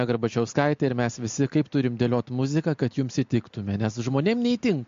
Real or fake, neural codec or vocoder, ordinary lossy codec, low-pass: real; none; MP3, 48 kbps; 7.2 kHz